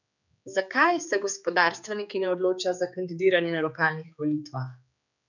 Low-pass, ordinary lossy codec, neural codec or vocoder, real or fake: 7.2 kHz; none; codec, 16 kHz, 4 kbps, X-Codec, HuBERT features, trained on general audio; fake